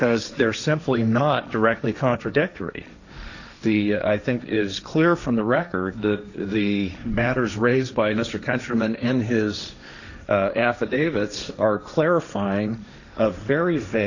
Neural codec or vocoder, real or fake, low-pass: codec, 16 kHz, 1.1 kbps, Voila-Tokenizer; fake; 7.2 kHz